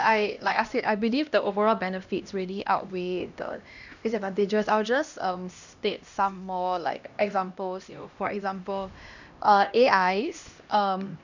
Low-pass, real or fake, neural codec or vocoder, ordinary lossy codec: 7.2 kHz; fake; codec, 16 kHz, 1 kbps, X-Codec, HuBERT features, trained on LibriSpeech; none